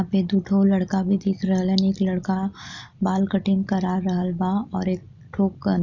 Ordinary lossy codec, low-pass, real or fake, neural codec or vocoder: none; 7.2 kHz; real; none